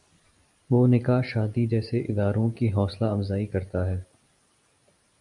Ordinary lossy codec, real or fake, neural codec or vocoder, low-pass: AAC, 64 kbps; real; none; 10.8 kHz